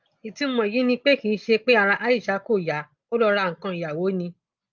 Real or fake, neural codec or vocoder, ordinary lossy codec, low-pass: real; none; Opus, 24 kbps; 7.2 kHz